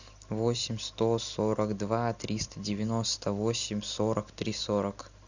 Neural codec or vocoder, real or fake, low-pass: none; real; 7.2 kHz